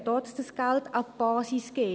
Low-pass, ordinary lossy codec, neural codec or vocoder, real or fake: none; none; none; real